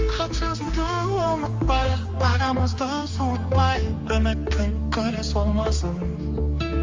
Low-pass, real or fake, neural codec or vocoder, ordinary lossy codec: 7.2 kHz; fake; codec, 16 kHz, 2 kbps, X-Codec, HuBERT features, trained on general audio; Opus, 32 kbps